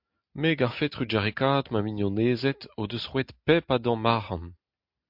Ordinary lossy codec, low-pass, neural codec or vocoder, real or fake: MP3, 48 kbps; 5.4 kHz; none; real